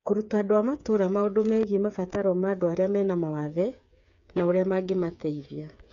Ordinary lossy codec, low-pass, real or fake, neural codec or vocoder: none; 7.2 kHz; fake; codec, 16 kHz, 8 kbps, FreqCodec, smaller model